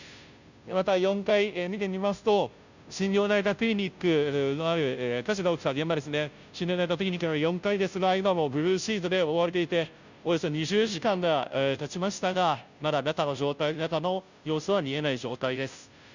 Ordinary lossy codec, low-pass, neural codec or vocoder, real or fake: none; 7.2 kHz; codec, 16 kHz, 0.5 kbps, FunCodec, trained on Chinese and English, 25 frames a second; fake